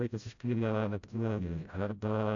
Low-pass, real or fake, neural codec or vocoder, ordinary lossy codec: 7.2 kHz; fake; codec, 16 kHz, 0.5 kbps, FreqCodec, smaller model; AAC, 64 kbps